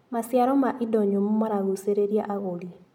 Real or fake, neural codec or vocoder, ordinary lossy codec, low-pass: real; none; MP3, 96 kbps; 19.8 kHz